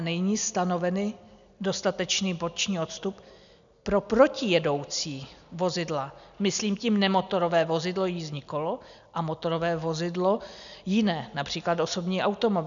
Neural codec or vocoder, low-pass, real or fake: none; 7.2 kHz; real